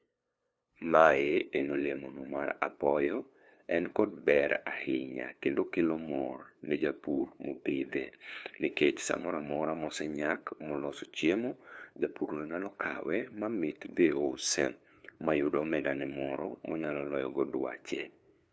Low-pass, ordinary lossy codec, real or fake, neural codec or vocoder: none; none; fake; codec, 16 kHz, 2 kbps, FunCodec, trained on LibriTTS, 25 frames a second